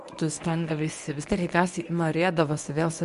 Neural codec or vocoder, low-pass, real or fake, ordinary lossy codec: codec, 24 kHz, 0.9 kbps, WavTokenizer, medium speech release version 1; 10.8 kHz; fake; AAC, 96 kbps